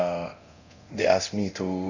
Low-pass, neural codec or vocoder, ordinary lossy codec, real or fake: 7.2 kHz; codec, 24 kHz, 0.9 kbps, DualCodec; none; fake